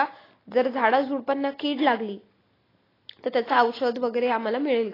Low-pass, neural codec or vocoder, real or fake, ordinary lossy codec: 5.4 kHz; none; real; AAC, 24 kbps